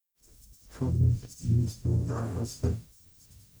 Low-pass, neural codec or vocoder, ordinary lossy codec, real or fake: none; codec, 44.1 kHz, 0.9 kbps, DAC; none; fake